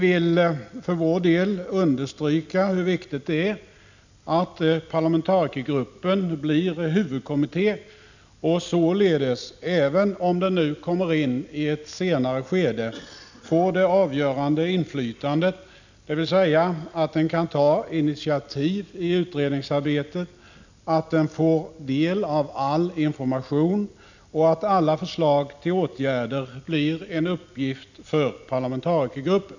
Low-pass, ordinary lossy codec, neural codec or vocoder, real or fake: 7.2 kHz; none; none; real